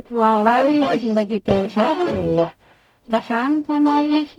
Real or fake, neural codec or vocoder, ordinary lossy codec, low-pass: fake; codec, 44.1 kHz, 0.9 kbps, DAC; none; 19.8 kHz